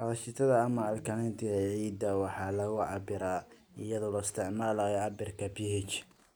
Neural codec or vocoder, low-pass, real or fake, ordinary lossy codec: none; none; real; none